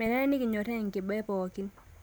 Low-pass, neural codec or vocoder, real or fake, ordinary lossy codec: none; none; real; none